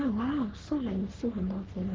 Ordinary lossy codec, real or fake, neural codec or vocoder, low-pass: Opus, 16 kbps; fake; codec, 44.1 kHz, 3.4 kbps, Pupu-Codec; 7.2 kHz